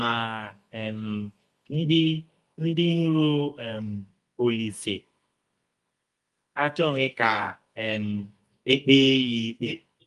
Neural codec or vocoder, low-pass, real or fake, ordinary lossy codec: codec, 24 kHz, 0.9 kbps, WavTokenizer, medium music audio release; 10.8 kHz; fake; Opus, 32 kbps